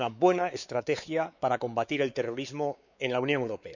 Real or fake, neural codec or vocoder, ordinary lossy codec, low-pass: fake; codec, 16 kHz, 4 kbps, X-Codec, WavLM features, trained on Multilingual LibriSpeech; none; 7.2 kHz